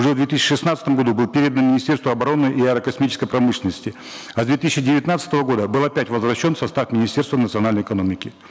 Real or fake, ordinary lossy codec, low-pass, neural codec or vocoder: real; none; none; none